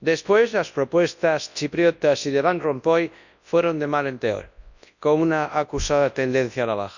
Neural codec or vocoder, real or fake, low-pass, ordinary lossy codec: codec, 24 kHz, 0.9 kbps, WavTokenizer, large speech release; fake; 7.2 kHz; none